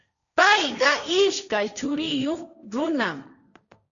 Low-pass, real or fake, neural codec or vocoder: 7.2 kHz; fake; codec, 16 kHz, 1.1 kbps, Voila-Tokenizer